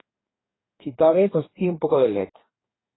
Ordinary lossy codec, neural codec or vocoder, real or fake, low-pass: AAC, 16 kbps; codec, 44.1 kHz, 2.6 kbps, DAC; fake; 7.2 kHz